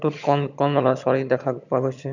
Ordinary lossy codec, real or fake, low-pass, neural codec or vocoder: none; fake; 7.2 kHz; vocoder, 22.05 kHz, 80 mel bands, HiFi-GAN